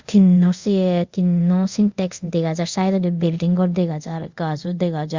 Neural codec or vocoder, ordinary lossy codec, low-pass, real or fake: codec, 24 kHz, 0.5 kbps, DualCodec; Opus, 64 kbps; 7.2 kHz; fake